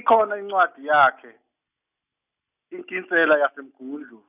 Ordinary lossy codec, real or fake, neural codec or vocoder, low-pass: none; real; none; 3.6 kHz